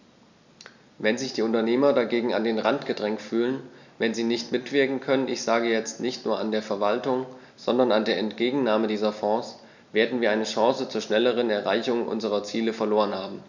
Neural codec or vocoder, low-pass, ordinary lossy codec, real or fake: none; 7.2 kHz; none; real